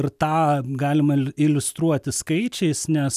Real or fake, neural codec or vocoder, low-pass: real; none; 14.4 kHz